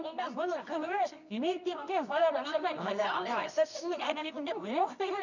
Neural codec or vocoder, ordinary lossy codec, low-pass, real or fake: codec, 24 kHz, 0.9 kbps, WavTokenizer, medium music audio release; none; 7.2 kHz; fake